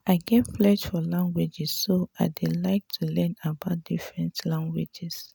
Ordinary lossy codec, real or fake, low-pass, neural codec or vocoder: none; real; none; none